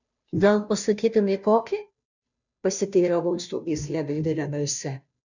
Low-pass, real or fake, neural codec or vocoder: 7.2 kHz; fake; codec, 16 kHz, 0.5 kbps, FunCodec, trained on Chinese and English, 25 frames a second